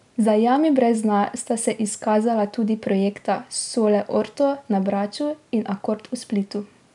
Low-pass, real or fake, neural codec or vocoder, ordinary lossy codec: 10.8 kHz; real; none; none